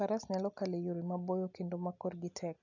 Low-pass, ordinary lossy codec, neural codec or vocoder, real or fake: 7.2 kHz; none; none; real